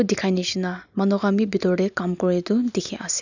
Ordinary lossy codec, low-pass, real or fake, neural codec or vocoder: none; 7.2 kHz; real; none